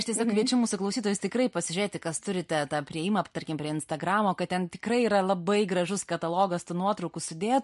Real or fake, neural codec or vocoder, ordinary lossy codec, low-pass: real; none; MP3, 48 kbps; 14.4 kHz